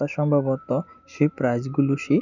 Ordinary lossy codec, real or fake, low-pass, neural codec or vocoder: none; real; 7.2 kHz; none